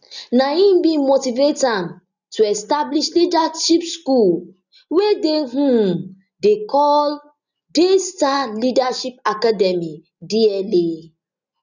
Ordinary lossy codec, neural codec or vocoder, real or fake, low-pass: none; none; real; 7.2 kHz